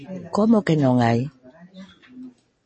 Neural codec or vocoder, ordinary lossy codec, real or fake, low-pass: none; MP3, 32 kbps; real; 10.8 kHz